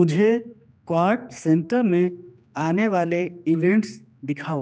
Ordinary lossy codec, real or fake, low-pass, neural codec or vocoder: none; fake; none; codec, 16 kHz, 2 kbps, X-Codec, HuBERT features, trained on general audio